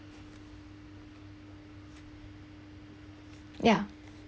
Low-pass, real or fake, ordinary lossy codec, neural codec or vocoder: none; real; none; none